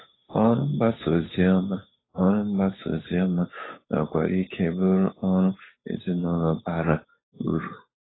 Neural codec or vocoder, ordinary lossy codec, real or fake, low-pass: codec, 16 kHz, 8 kbps, FunCodec, trained on Chinese and English, 25 frames a second; AAC, 16 kbps; fake; 7.2 kHz